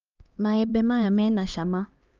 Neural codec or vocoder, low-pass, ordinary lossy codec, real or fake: codec, 16 kHz, 2 kbps, X-Codec, HuBERT features, trained on LibriSpeech; 7.2 kHz; Opus, 24 kbps; fake